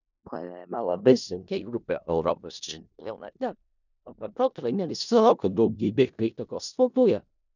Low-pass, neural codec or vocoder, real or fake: 7.2 kHz; codec, 16 kHz in and 24 kHz out, 0.4 kbps, LongCat-Audio-Codec, four codebook decoder; fake